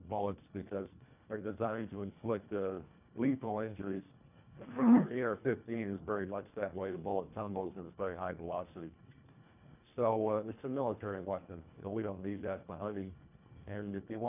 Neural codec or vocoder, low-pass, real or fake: codec, 24 kHz, 1.5 kbps, HILCodec; 3.6 kHz; fake